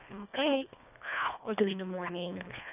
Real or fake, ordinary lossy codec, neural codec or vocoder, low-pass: fake; none; codec, 24 kHz, 1.5 kbps, HILCodec; 3.6 kHz